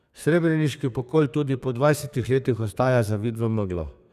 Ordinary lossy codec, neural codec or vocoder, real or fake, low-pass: none; codec, 32 kHz, 1.9 kbps, SNAC; fake; 14.4 kHz